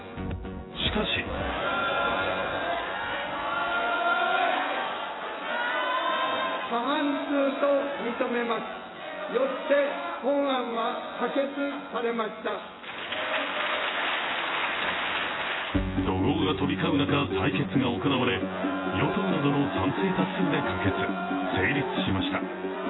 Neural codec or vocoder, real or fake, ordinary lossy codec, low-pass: vocoder, 24 kHz, 100 mel bands, Vocos; fake; AAC, 16 kbps; 7.2 kHz